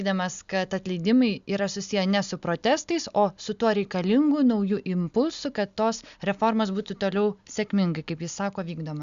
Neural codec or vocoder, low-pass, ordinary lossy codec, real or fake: none; 7.2 kHz; Opus, 64 kbps; real